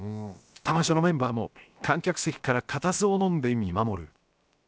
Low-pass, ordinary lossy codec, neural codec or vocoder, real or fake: none; none; codec, 16 kHz, 0.7 kbps, FocalCodec; fake